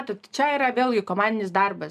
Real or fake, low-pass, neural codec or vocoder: real; 14.4 kHz; none